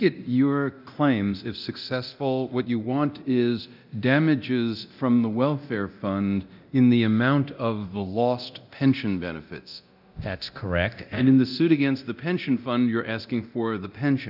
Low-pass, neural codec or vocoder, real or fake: 5.4 kHz; codec, 24 kHz, 0.9 kbps, DualCodec; fake